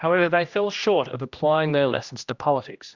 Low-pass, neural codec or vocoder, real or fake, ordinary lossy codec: 7.2 kHz; codec, 16 kHz, 1 kbps, X-Codec, HuBERT features, trained on general audio; fake; Opus, 64 kbps